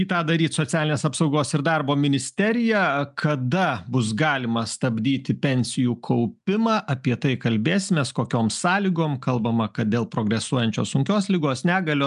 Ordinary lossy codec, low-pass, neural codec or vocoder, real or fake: MP3, 96 kbps; 10.8 kHz; none; real